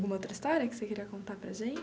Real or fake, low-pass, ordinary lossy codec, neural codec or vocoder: real; none; none; none